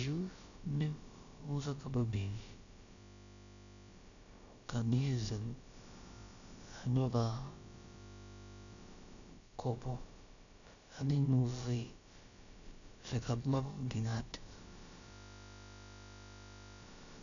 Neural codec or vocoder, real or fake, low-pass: codec, 16 kHz, about 1 kbps, DyCAST, with the encoder's durations; fake; 7.2 kHz